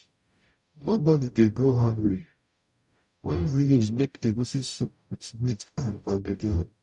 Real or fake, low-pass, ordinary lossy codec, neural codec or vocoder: fake; 10.8 kHz; none; codec, 44.1 kHz, 0.9 kbps, DAC